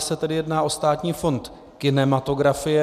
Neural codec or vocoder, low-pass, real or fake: none; 14.4 kHz; real